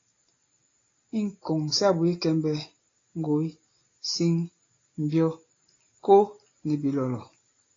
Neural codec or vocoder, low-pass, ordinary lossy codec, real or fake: none; 7.2 kHz; AAC, 32 kbps; real